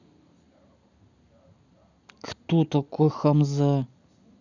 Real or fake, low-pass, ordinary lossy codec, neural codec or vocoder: real; 7.2 kHz; none; none